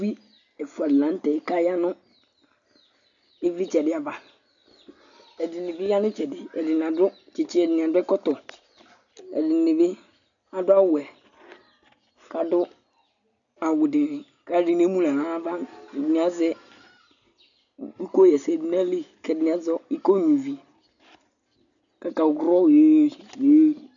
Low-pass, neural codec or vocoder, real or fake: 7.2 kHz; none; real